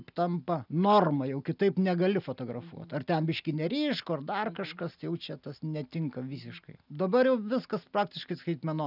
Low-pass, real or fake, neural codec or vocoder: 5.4 kHz; real; none